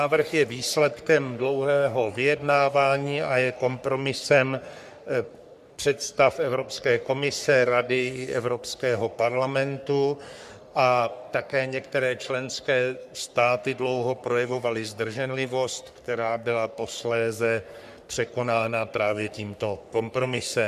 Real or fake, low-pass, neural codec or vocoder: fake; 14.4 kHz; codec, 44.1 kHz, 3.4 kbps, Pupu-Codec